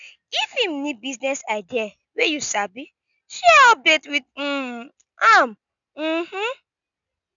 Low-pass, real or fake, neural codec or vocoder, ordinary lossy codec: 7.2 kHz; real; none; none